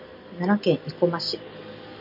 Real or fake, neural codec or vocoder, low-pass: real; none; 5.4 kHz